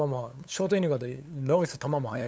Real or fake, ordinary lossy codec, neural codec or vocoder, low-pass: fake; none; codec, 16 kHz, 8 kbps, FunCodec, trained on LibriTTS, 25 frames a second; none